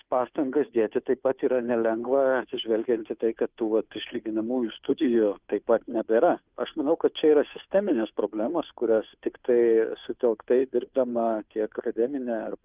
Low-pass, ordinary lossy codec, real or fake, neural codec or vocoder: 3.6 kHz; Opus, 32 kbps; fake; codec, 16 kHz, 2 kbps, FunCodec, trained on Chinese and English, 25 frames a second